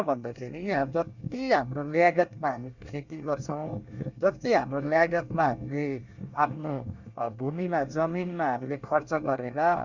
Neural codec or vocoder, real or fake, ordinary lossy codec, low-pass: codec, 24 kHz, 1 kbps, SNAC; fake; Opus, 64 kbps; 7.2 kHz